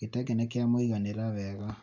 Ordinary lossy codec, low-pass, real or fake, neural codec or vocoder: none; 7.2 kHz; real; none